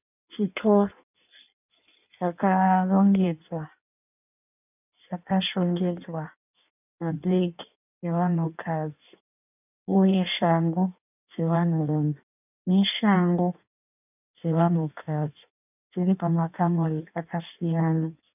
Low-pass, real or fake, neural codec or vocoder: 3.6 kHz; fake; codec, 16 kHz in and 24 kHz out, 1.1 kbps, FireRedTTS-2 codec